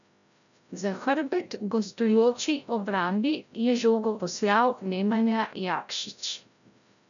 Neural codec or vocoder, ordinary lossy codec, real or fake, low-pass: codec, 16 kHz, 0.5 kbps, FreqCodec, larger model; none; fake; 7.2 kHz